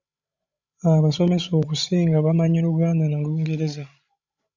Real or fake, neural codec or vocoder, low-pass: fake; codec, 16 kHz, 16 kbps, FreqCodec, larger model; 7.2 kHz